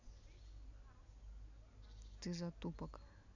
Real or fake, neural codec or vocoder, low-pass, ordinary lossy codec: real; none; 7.2 kHz; none